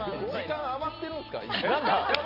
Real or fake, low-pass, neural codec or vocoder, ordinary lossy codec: real; 5.4 kHz; none; none